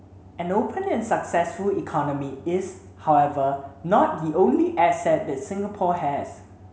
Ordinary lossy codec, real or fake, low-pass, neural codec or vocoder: none; real; none; none